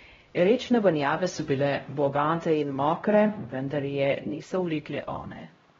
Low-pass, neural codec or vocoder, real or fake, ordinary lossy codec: 7.2 kHz; codec, 16 kHz, 0.5 kbps, X-Codec, HuBERT features, trained on LibriSpeech; fake; AAC, 24 kbps